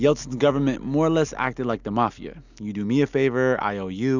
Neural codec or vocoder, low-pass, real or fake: none; 7.2 kHz; real